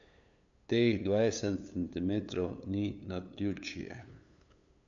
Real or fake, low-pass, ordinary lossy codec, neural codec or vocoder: fake; 7.2 kHz; none; codec, 16 kHz, 8 kbps, FunCodec, trained on LibriTTS, 25 frames a second